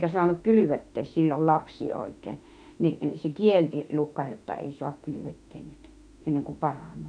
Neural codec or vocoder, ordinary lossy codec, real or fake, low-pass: autoencoder, 48 kHz, 32 numbers a frame, DAC-VAE, trained on Japanese speech; none; fake; 9.9 kHz